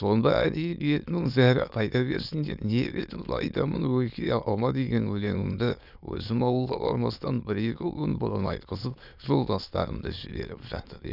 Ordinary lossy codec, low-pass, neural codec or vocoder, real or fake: none; 5.4 kHz; autoencoder, 22.05 kHz, a latent of 192 numbers a frame, VITS, trained on many speakers; fake